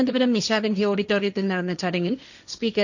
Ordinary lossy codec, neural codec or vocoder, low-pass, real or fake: none; codec, 16 kHz, 1.1 kbps, Voila-Tokenizer; 7.2 kHz; fake